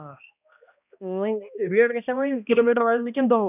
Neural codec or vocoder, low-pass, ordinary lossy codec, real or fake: codec, 16 kHz, 1 kbps, X-Codec, HuBERT features, trained on balanced general audio; 3.6 kHz; none; fake